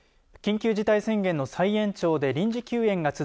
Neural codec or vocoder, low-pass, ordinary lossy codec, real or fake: none; none; none; real